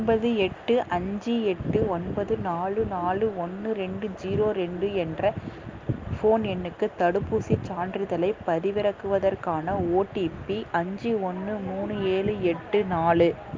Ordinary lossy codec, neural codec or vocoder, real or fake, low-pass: Opus, 32 kbps; none; real; 7.2 kHz